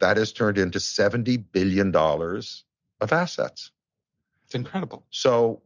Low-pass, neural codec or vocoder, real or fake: 7.2 kHz; none; real